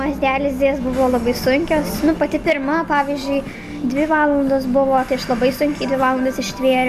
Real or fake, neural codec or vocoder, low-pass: real; none; 14.4 kHz